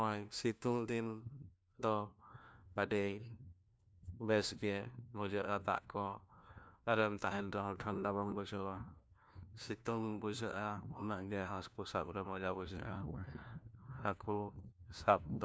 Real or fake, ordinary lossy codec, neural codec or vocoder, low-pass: fake; none; codec, 16 kHz, 1 kbps, FunCodec, trained on LibriTTS, 50 frames a second; none